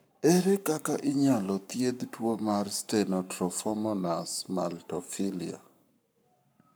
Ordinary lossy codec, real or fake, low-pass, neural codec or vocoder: none; fake; none; codec, 44.1 kHz, 7.8 kbps, Pupu-Codec